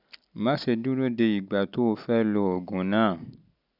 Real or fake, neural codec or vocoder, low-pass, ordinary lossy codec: real; none; 5.4 kHz; none